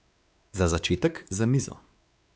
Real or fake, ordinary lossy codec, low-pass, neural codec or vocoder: fake; none; none; codec, 16 kHz, 4 kbps, X-Codec, WavLM features, trained on Multilingual LibriSpeech